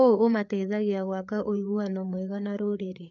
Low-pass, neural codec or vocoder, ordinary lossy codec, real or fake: 7.2 kHz; codec, 16 kHz, 4 kbps, FreqCodec, larger model; none; fake